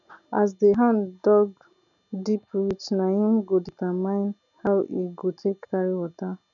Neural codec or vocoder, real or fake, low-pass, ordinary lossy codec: none; real; 7.2 kHz; none